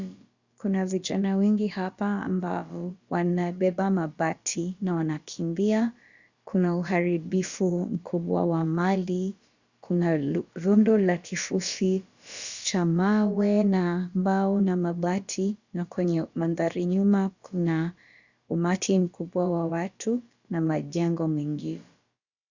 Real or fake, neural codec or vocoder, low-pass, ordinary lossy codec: fake; codec, 16 kHz, about 1 kbps, DyCAST, with the encoder's durations; 7.2 kHz; Opus, 64 kbps